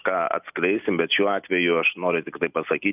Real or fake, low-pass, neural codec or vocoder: real; 3.6 kHz; none